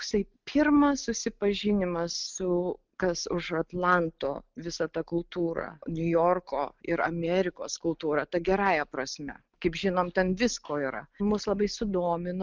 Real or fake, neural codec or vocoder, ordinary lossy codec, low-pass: real; none; Opus, 32 kbps; 7.2 kHz